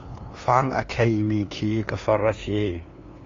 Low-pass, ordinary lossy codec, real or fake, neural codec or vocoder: 7.2 kHz; AAC, 32 kbps; fake; codec, 16 kHz, 2 kbps, FunCodec, trained on LibriTTS, 25 frames a second